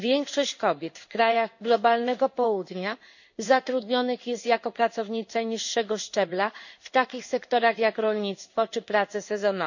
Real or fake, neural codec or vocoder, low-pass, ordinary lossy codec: fake; codec, 16 kHz in and 24 kHz out, 1 kbps, XY-Tokenizer; 7.2 kHz; none